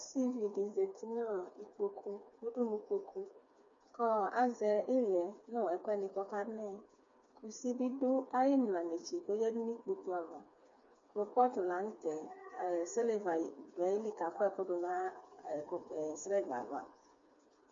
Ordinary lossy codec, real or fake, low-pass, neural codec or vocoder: MP3, 48 kbps; fake; 7.2 kHz; codec, 16 kHz, 4 kbps, FreqCodec, smaller model